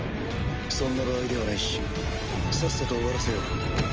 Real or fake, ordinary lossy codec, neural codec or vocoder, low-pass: real; Opus, 24 kbps; none; 7.2 kHz